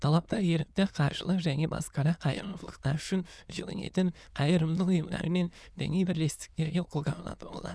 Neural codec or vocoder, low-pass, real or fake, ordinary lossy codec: autoencoder, 22.05 kHz, a latent of 192 numbers a frame, VITS, trained on many speakers; none; fake; none